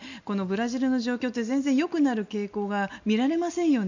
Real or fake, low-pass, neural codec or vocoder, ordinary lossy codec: real; 7.2 kHz; none; none